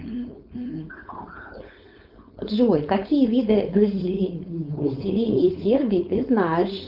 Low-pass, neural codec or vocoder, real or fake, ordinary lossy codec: 5.4 kHz; codec, 16 kHz, 4.8 kbps, FACodec; fake; Opus, 24 kbps